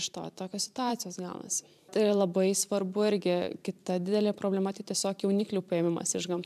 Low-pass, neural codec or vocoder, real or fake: 14.4 kHz; vocoder, 44.1 kHz, 128 mel bands every 512 samples, BigVGAN v2; fake